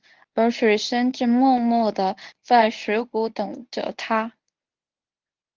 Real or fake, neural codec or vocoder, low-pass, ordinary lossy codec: fake; codec, 24 kHz, 0.9 kbps, WavTokenizer, medium speech release version 1; 7.2 kHz; Opus, 32 kbps